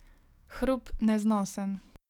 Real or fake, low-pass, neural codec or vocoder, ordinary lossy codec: fake; 19.8 kHz; codec, 44.1 kHz, 7.8 kbps, DAC; none